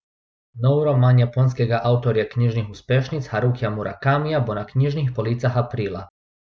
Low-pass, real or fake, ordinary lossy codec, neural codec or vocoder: none; real; none; none